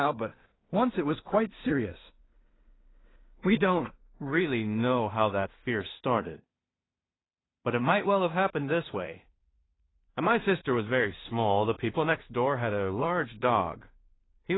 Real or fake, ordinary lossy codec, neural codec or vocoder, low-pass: fake; AAC, 16 kbps; codec, 16 kHz in and 24 kHz out, 0.4 kbps, LongCat-Audio-Codec, two codebook decoder; 7.2 kHz